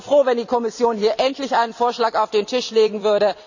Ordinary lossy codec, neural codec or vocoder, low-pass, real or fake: none; vocoder, 44.1 kHz, 128 mel bands every 256 samples, BigVGAN v2; 7.2 kHz; fake